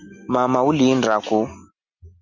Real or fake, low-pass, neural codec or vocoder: real; 7.2 kHz; none